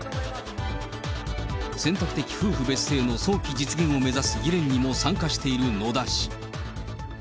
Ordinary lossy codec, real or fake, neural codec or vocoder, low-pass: none; real; none; none